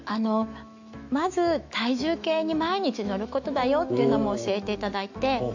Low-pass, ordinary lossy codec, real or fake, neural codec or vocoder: 7.2 kHz; AAC, 48 kbps; fake; autoencoder, 48 kHz, 128 numbers a frame, DAC-VAE, trained on Japanese speech